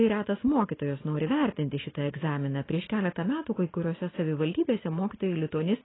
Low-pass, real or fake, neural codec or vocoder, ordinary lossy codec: 7.2 kHz; real; none; AAC, 16 kbps